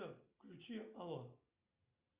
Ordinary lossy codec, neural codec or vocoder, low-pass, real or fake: Opus, 64 kbps; none; 3.6 kHz; real